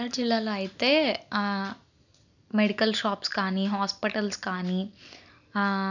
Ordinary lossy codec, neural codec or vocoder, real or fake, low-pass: none; none; real; 7.2 kHz